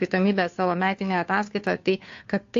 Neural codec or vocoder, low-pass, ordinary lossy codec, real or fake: codec, 16 kHz, 2 kbps, FunCodec, trained on Chinese and English, 25 frames a second; 7.2 kHz; AAC, 48 kbps; fake